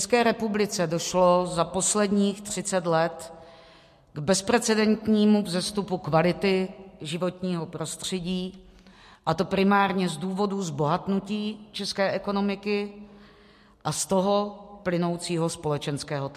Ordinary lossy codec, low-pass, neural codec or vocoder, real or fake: MP3, 64 kbps; 14.4 kHz; none; real